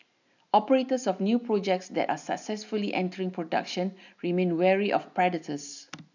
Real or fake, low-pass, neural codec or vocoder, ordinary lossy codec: real; 7.2 kHz; none; none